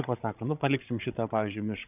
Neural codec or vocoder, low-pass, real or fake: codec, 16 kHz, 16 kbps, FreqCodec, larger model; 3.6 kHz; fake